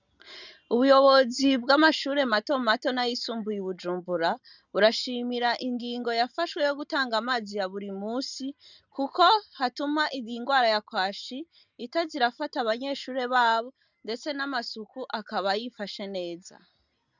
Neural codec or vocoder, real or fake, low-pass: none; real; 7.2 kHz